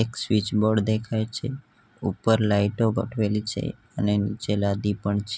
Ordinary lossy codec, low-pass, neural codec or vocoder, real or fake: none; none; none; real